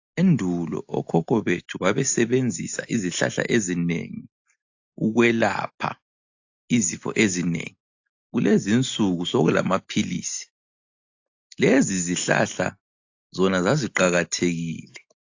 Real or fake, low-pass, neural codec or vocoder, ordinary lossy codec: real; 7.2 kHz; none; AAC, 48 kbps